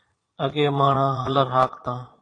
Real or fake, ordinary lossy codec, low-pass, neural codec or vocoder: fake; AAC, 32 kbps; 9.9 kHz; vocoder, 22.05 kHz, 80 mel bands, Vocos